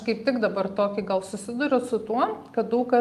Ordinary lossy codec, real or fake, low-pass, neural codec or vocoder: Opus, 32 kbps; real; 14.4 kHz; none